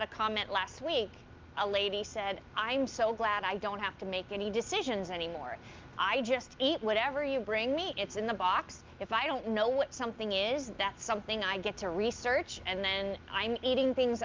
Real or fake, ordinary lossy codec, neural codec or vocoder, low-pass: real; Opus, 32 kbps; none; 7.2 kHz